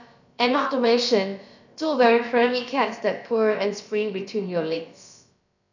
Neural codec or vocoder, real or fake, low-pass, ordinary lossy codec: codec, 16 kHz, about 1 kbps, DyCAST, with the encoder's durations; fake; 7.2 kHz; none